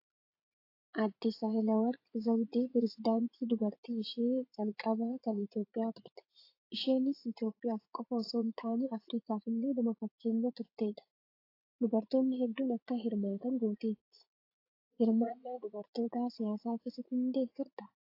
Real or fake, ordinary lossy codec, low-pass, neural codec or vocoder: fake; AAC, 32 kbps; 5.4 kHz; vocoder, 44.1 kHz, 80 mel bands, Vocos